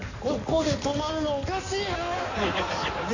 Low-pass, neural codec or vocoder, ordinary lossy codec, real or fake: 7.2 kHz; codec, 16 kHz in and 24 kHz out, 2.2 kbps, FireRedTTS-2 codec; none; fake